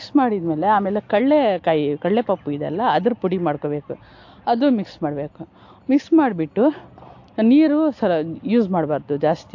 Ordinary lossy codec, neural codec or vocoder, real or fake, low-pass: AAC, 48 kbps; none; real; 7.2 kHz